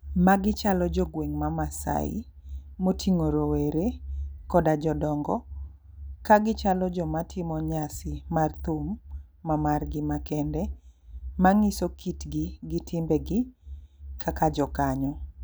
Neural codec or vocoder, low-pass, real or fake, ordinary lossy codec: none; none; real; none